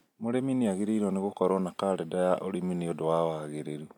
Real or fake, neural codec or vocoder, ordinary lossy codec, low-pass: real; none; none; 19.8 kHz